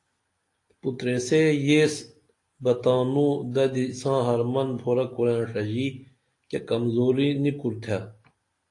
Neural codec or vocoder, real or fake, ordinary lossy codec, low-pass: none; real; AAC, 48 kbps; 10.8 kHz